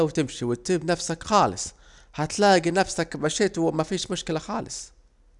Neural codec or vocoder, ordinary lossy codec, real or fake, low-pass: none; none; real; 10.8 kHz